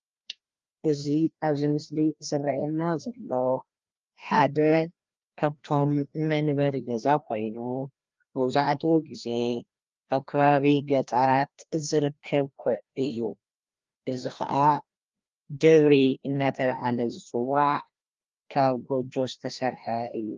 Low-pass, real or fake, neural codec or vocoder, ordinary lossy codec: 7.2 kHz; fake; codec, 16 kHz, 1 kbps, FreqCodec, larger model; Opus, 32 kbps